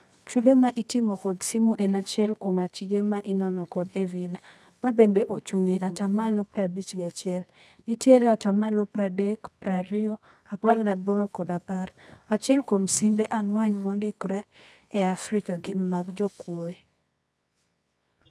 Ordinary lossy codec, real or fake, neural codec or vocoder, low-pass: none; fake; codec, 24 kHz, 0.9 kbps, WavTokenizer, medium music audio release; none